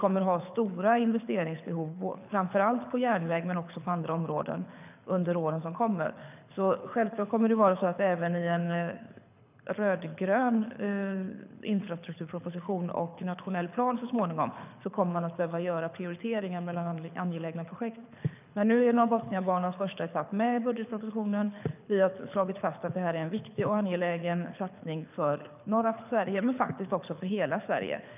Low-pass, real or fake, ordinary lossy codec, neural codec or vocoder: 3.6 kHz; fake; none; codec, 24 kHz, 6 kbps, HILCodec